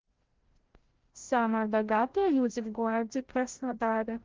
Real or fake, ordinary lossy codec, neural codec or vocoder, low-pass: fake; Opus, 16 kbps; codec, 16 kHz, 0.5 kbps, FreqCodec, larger model; 7.2 kHz